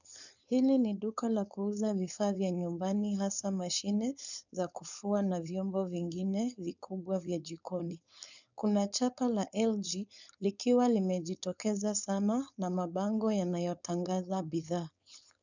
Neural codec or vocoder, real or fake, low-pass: codec, 16 kHz, 4.8 kbps, FACodec; fake; 7.2 kHz